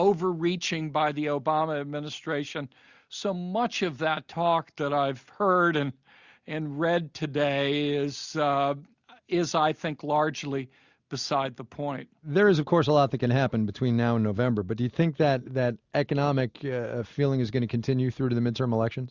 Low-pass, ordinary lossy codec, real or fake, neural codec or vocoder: 7.2 kHz; Opus, 64 kbps; real; none